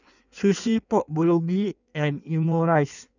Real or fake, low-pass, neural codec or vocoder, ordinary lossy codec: fake; 7.2 kHz; codec, 16 kHz in and 24 kHz out, 1.1 kbps, FireRedTTS-2 codec; none